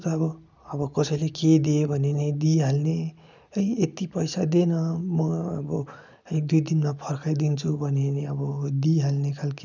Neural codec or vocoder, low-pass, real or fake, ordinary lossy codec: none; 7.2 kHz; real; none